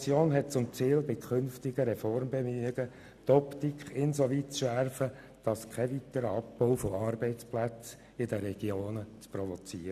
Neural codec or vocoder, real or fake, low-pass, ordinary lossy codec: none; real; 14.4 kHz; none